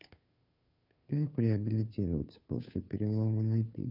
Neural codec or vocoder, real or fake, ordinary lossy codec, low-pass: codec, 16 kHz, 2 kbps, FunCodec, trained on Chinese and English, 25 frames a second; fake; none; 5.4 kHz